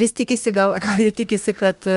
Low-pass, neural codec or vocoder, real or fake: 10.8 kHz; codec, 24 kHz, 1 kbps, SNAC; fake